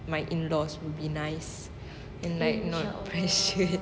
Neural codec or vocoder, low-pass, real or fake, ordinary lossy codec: none; none; real; none